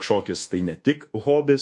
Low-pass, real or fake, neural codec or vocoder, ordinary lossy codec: 10.8 kHz; fake; codec, 24 kHz, 1.2 kbps, DualCodec; MP3, 48 kbps